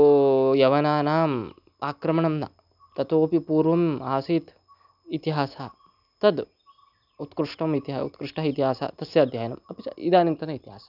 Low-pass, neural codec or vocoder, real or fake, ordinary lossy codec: 5.4 kHz; none; real; none